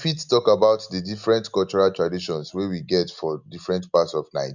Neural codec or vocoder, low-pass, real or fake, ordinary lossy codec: none; 7.2 kHz; real; none